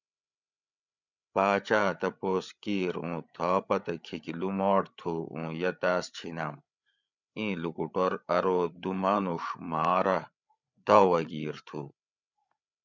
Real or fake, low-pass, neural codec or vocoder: fake; 7.2 kHz; codec, 16 kHz, 8 kbps, FreqCodec, larger model